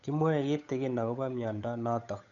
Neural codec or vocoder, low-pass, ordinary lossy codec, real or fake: codec, 16 kHz, 8 kbps, FunCodec, trained on Chinese and English, 25 frames a second; 7.2 kHz; none; fake